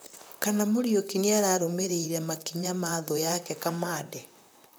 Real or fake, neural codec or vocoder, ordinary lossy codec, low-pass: fake; vocoder, 44.1 kHz, 128 mel bands, Pupu-Vocoder; none; none